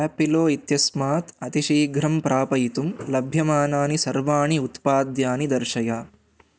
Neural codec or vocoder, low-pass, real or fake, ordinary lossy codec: none; none; real; none